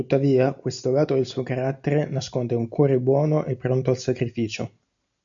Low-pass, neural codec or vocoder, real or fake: 7.2 kHz; none; real